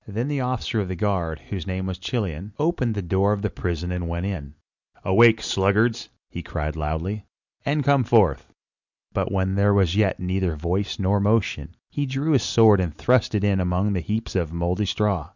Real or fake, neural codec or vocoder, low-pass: real; none; 7.2 kHz